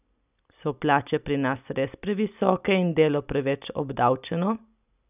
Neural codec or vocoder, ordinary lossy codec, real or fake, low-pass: none; none; real; 3.6 kHz